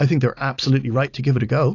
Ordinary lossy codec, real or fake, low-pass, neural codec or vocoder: AAC, 48 kbps; real; 7.2 kHz; none